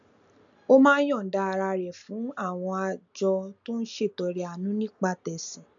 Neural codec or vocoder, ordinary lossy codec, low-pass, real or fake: none; none; 7.2 kHz; real